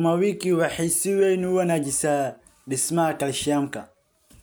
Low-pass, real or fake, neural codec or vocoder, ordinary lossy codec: none; real; none; none